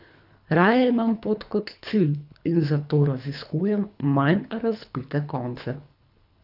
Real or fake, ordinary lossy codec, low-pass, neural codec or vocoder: fake; none; 5.4 kHz; codec, 24 kHz, 3 kbps, HILCodec